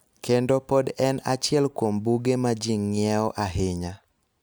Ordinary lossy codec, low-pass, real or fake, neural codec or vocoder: none; none; real; none